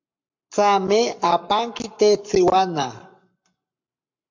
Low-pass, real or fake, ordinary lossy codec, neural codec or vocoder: 7.2 kHz; fake; MP3, 64 kbps; codec, 44.1 kHz, 7.8 kbps, Pupu-Codec